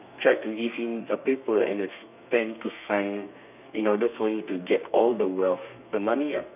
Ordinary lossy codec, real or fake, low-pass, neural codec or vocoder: none; fake; 3.6 kHz; codec, 32 kHz, 1.9 kbps, SNAC